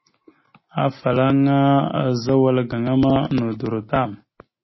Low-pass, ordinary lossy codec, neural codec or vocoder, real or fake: 7.2 kHz; MP3, 24 kbps; none; real